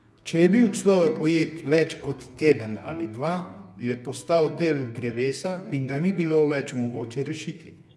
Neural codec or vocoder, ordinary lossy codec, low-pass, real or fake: codec, 24 kHz, 0.9 kbps, WavTokenizer, medium music audio release; none; none; fake